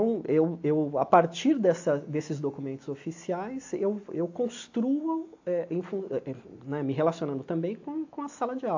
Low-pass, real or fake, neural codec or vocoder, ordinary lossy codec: 7.2 kHz; real; none; AAC, 48 kbps